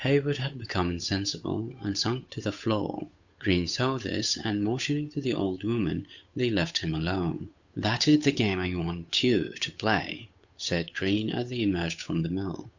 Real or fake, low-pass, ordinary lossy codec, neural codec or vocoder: fake; 7.2 kHz; Opus, 64 kbps; codec, 16 kHz, 8 kbps, FunCodec, trained on Chinese and English, 25 frames a second